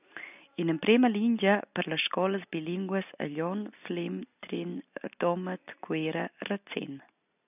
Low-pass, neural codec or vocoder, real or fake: 3.6 kHz; none; real